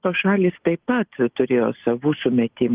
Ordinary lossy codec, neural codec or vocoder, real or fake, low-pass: Opus, 32 kbps; none; real; 3.6 kHz